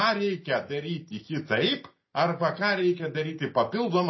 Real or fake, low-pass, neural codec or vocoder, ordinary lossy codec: real; 7.2 kHz; none; MP3, 24 kbps